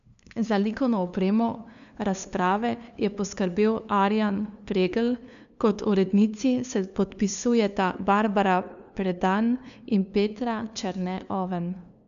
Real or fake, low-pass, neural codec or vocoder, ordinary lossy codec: fake; 7.2 kHz; codec, 16 kHz, 2 kbps, FunCodec, trained on LibriTTS, 25 frames a second; none